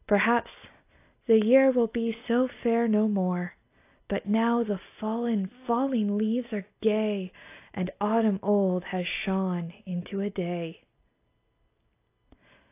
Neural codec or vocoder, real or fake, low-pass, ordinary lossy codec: none; real; 3.6 kHz; AAC, 24 kbps